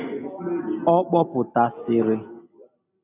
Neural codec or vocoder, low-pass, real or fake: none; 3.6 kHz; real